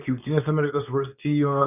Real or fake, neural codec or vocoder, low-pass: fake; codec, 24 kHz, 0.9 kbps, WavTokenizer, medium speech release version 2; 3.6 kHz